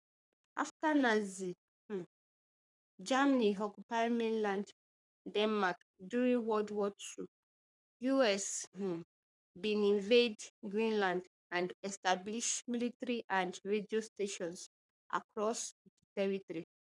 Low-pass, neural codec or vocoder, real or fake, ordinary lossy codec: 10.8 kHz; codec, 44.1 kHz, 3.4 kbps, Pupu-Codec; fake; none